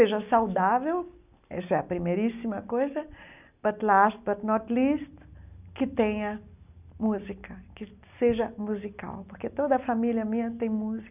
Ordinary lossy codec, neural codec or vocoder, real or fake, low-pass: none; none; real; 3.6 kHz